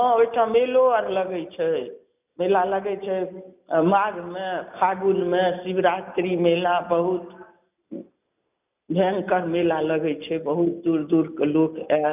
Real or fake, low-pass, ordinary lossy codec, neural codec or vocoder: real; 3.6 kHz; none; none